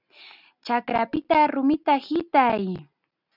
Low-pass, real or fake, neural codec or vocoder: 5.4 kHz; real; none